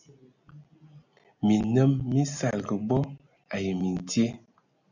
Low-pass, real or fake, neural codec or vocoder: 7.2 kHz; real; none